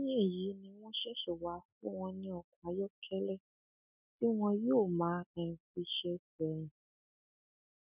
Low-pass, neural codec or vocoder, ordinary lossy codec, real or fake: 3.6 kHz; none; none; real